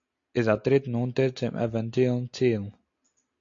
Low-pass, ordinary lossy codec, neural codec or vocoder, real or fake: 7.2 kHz; AAC, 48 kbps; none; real